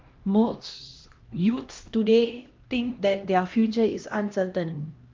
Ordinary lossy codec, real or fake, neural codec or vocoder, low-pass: Opus, 32 kbps; fake; codec, 16 kHz, 1 kbps, X-Codec, HuBERT features, trained on LibriSpeech; 7.2 kHz